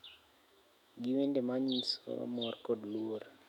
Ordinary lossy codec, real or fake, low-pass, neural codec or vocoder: none; fake; 19.8 kHz; autoencoder, 48 kHz, 128 numbers a frame, DAC-VAE, trained on Japanese speech